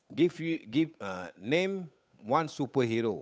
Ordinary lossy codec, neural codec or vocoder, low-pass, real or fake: none; codec, 16 kHz, 8 kbps, FunCodec, trained on Chinese and English, 25 frames a second; none; fake